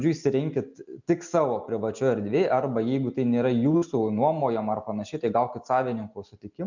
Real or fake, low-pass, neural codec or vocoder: real; 7.2 kHz; none